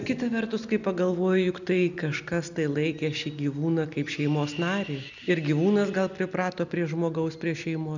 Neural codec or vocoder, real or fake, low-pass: none; real; 7.2 kHz